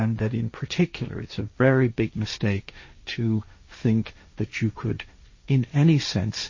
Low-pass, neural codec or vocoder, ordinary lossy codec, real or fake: 7.2 kHz; codec, 16 kHz, 1.1 kbps, Voila-Tokenizer; MP3, 32 kbps; fake